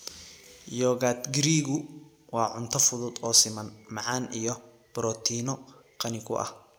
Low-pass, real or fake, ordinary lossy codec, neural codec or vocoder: none; real; none; none